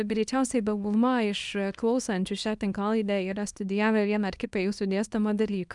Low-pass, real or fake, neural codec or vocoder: 10.8 kHz; fake; codec, 24 kHz, 0.9 kbps, WavTokenizer, small release